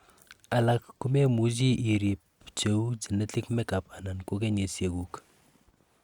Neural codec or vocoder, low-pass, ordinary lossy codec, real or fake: none; 19.8 kHz; Opus, 64 kbps; real